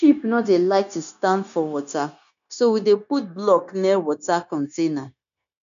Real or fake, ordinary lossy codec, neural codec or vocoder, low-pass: fake; none; codec, 16 kHz, 0.9 kbps, LongCat-Audio-Codec; 7.2 kHz